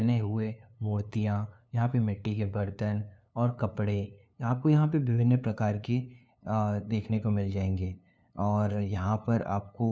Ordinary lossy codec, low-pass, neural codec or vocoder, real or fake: none; none; codec, 16 kHz, 2 kbps, FunCodec, trained on LibriTTS, 25 frames a second; fake